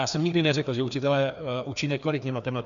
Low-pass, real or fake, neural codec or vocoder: 7.2 kHz; fake; codec, 16 kHz, 2 kbps, FreqCodec, larger model